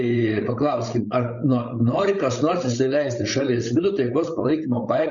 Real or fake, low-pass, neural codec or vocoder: fake; 7.2 kHz; codec, 16 kHz, 8 kbps, FreqCodec, larger model